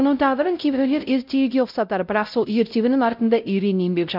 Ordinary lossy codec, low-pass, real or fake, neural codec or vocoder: none; 5.4 kHz; fake; codec, 16 kHz, 0.5 kbps, X-Codec, WavLM features, trained on Multilingual LibriSpeech